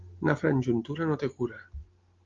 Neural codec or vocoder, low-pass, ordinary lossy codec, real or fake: none; 7.2 kHz; Opus, 32 kbps; real